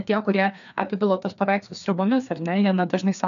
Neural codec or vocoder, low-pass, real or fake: codec, 16 kHz, 2 kbps, FreqCodec, larger model; 7.2 kHz; fake